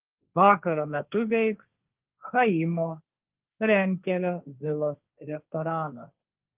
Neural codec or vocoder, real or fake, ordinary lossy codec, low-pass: codec, 16 kHz, 1.1 kbps, Voila-Tokenizer; fake; Opus, 24 kbps; 3.6 kHz